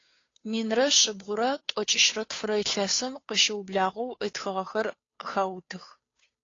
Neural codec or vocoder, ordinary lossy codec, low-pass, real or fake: codec, 16 kHz, 2 kbps, FunCodec, trained on Chinese and English, 25 frames a second; AAC, 32 kbps; 7.2 kHz; fake